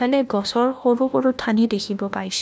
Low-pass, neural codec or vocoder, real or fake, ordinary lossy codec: none; codec, 16 kHz, 1 kbps, FunCodec, trained on LibriTTS, 50 frames a second; fake; none